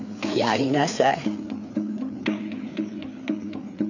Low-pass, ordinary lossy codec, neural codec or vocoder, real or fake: 7.2 kHz; none; codec, 16 kHz, 4 kbps, FreqCodec, larger model; fake